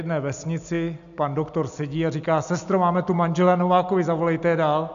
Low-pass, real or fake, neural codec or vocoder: 7.2 kHz; real; none